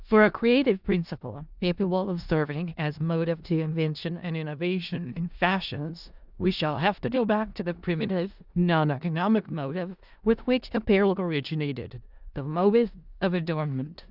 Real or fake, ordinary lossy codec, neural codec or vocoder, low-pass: fake; Opus, 64 kbps; codec, 16 kHz in and 24 kHz out, 0.4 kbps, LongCat-Audio-Codec, four codebook decoder; 5.4 kHz